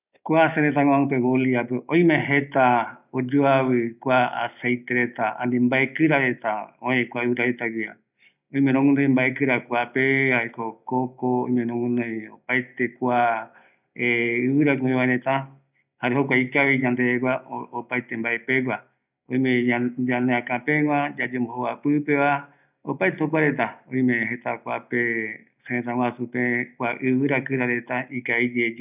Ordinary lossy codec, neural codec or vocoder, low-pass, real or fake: none; none; 3.6 kHz; real